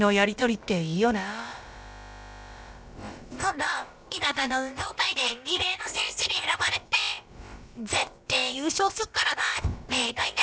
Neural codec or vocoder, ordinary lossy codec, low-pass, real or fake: codec, 16 kHz, about 1 kbps, DyCAST, with the encoder's durations; none; none; fake